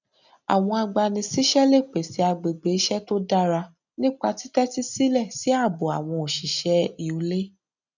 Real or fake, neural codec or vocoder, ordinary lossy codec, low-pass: real; none; none; 7.2 kHz